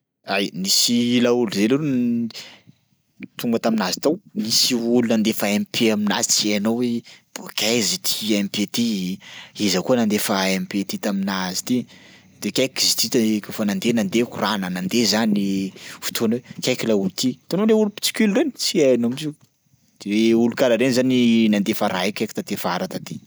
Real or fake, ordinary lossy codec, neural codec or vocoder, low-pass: real; none; none; none